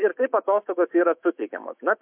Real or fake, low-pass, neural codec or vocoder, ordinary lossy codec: fake; 3.6 kHz; autoencoder, 48 kHz, 128 numbers a frame, DAC-VAE, trained on Japanese speech; MP3, 32 kbps